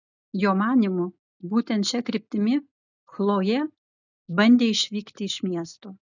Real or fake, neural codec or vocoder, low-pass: real; none; 7.2 kHz